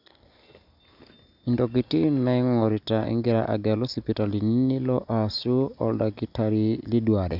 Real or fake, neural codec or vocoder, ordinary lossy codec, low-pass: real; none; none; 5.4 kHz